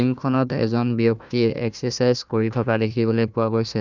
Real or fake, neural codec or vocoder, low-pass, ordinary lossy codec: fake; codec, 16 kHz, 1 kbps, FunCodec, trained on Chinese and English, 50 frames a second; 7.2 kHz; none